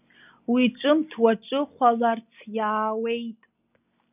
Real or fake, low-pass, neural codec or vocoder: real; 3.6 kHz; none